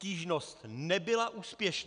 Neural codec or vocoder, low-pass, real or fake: none; 9.9 kHz; real